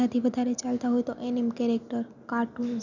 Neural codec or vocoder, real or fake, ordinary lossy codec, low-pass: none; real; none; 7.2 kHz